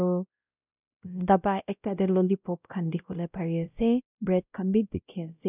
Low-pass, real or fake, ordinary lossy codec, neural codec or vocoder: 3.6 kHz; fake; none; codec, 16 kHz, 0.5 kbps, X-Codec, WavLM features, trained on Multilingual LibriSpeech